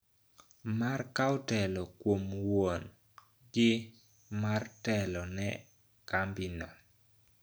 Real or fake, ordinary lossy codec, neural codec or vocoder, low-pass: real; none; none; none